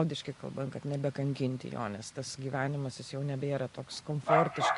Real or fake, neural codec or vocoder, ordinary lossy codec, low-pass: real; none; MP3, 48 kbps; 10.8 kHz